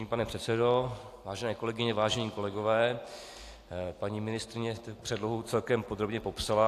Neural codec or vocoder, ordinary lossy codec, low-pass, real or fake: none; AAC, 64 kbps; 14.4 kHz; real